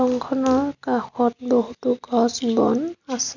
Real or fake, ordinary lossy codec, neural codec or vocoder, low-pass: real; none; none; 7.2 kHz